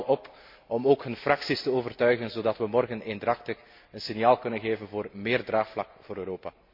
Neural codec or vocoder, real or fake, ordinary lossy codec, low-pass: none; real; MP3, 32 kbps; 5.4 kHz